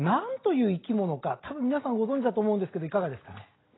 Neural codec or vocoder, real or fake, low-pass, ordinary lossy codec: none; real; 7.2 kHz; AAC, 16 kbps